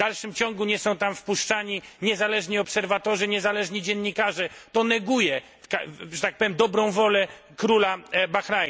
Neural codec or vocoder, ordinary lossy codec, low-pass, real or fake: none; none; none; real